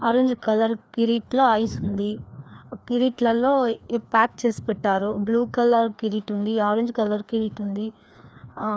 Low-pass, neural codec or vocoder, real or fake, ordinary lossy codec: none; codec, 16 kHz, 2 kbps, FreqCodec, larger model; fake; none